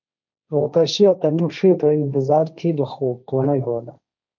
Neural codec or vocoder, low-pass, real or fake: codec, 16 kHz, 1.1 kbps, Voila-Tokenizer; 7.2 kHz; fake